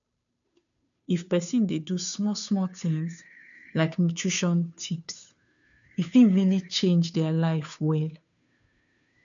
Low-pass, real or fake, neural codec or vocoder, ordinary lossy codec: 7.2 kHz; fake; codec, 16 kHz, 2 kbps, FunCodec, trained on Chinese and English, 25 frames a second; none